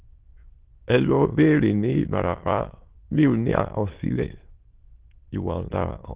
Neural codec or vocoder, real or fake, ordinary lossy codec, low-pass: autoencoder, 22.05 kHz, a latent of 192 numbers a frame, VITS, trained on many speakers; fake; Opus, 16 kbps; 3.6 kHz